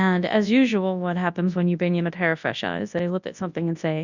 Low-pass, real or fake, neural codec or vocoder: 7.2 kHz; fake; codec, 24 kHz, 0.9 kbps, WavTokenizer, large speech release